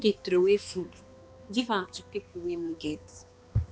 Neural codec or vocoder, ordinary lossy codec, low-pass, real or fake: codec, 16 kHz, 2 kbps, X-Codec, HuBERT features, trained on balanced general audio; none; none; fake